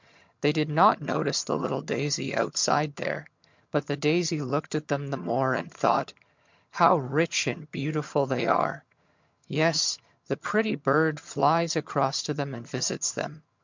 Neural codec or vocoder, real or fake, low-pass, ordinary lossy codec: vocoder, 22.05 kHz, 80 mel bands, HiFi-GAN; fake; 7.2 kHz; MP3, 64 kbps